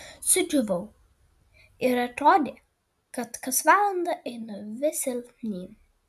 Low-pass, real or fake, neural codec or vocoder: 14.4 kHz; real; none